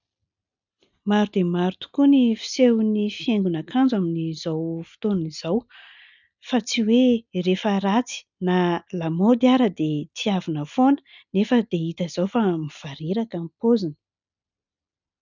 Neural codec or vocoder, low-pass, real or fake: none; 7.2 kHz; real